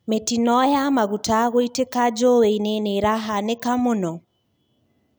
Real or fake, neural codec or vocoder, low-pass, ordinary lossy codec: real; none; none; none